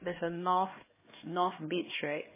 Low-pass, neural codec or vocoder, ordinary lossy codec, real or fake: 3.6 kHz; codec, 16 kHz, 2 kbps, X-Codec, HuBERT features, trained on LibriSpeech; MP3, 16 kbps; fake